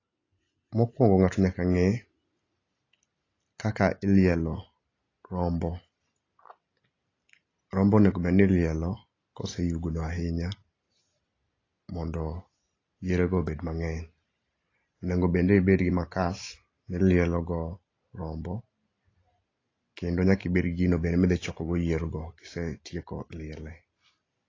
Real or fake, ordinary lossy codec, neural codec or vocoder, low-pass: real; AAC, 32 kbps; none; 7.2 kHz